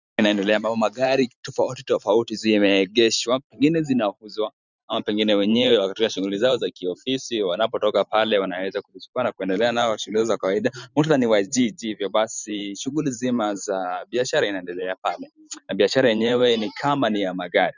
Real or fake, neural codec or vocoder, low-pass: fake; vocoder, 44.1 kHz, 128 mel bands every 512 samples, BigVGAN v2; 7.2 kHz